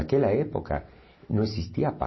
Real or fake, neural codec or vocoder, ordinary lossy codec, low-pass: real; none; MP3, 24 kbps; 7.2 kHz